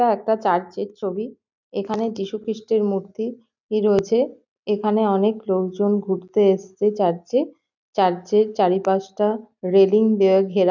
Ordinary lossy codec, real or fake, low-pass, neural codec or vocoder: none; real; 7.2 kHz; none